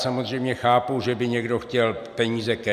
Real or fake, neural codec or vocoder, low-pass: real; none; 14.4 kHz